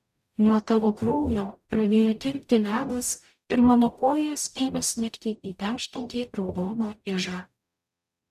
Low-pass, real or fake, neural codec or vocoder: 14.4 kHz; fake; codec, 44.1 kHz, 0.9 kbps, DAC